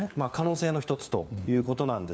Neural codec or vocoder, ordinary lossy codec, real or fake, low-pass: codec, 16 kHz, 4 kbps, FunCodec, trained on LibriTTS, 50 frames a second; none; fake; none